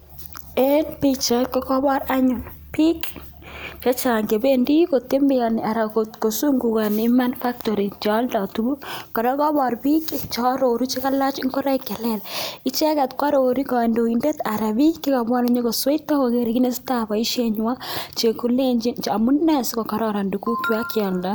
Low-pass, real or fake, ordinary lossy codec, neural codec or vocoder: none; real; none; none